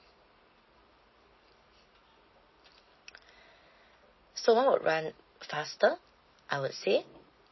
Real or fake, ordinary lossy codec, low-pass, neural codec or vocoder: real; MP3, 24 kbps; 7.2 kHz; none